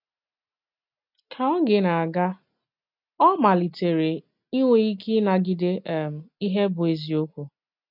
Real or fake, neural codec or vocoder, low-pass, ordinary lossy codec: real; none; 5.4 kHz; none